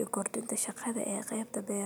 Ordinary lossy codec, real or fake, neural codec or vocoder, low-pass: none; real; none; none